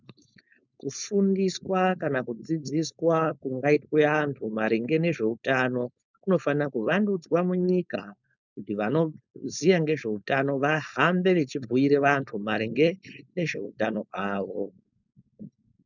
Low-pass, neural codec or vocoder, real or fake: 7.2 kHz; codec, 16 kHz, 4.8 kbps, FACodec; fake